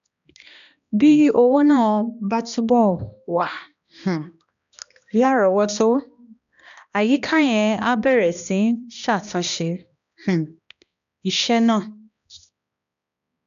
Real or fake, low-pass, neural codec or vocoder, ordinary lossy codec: fake; 7.2 kHz; codec, 16 kHz, 1 kbps, X-Codec, HuBERT features, trained on balanced general audio; none